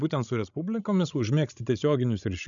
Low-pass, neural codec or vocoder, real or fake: 7.2 kHz; codec, 16 kHz, 16 kbps, FreqCodec, larger model; fake